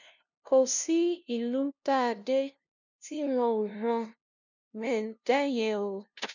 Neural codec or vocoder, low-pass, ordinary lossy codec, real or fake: codec, 16 kHz, 0.5 kbps, FunCodec, trained on LibriTTS, 25 frames a second; 7.2 kHz; none; fake